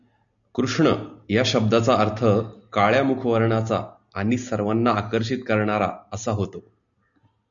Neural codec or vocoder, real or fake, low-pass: none; real; 7.2 kHz